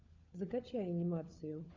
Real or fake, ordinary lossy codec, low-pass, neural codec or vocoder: fake; MP3, 64 kbps; 7.2 kHz; codec, 16 kHz, 4 kbps, FunCodec, trained on LibriTTS, 50 frames a second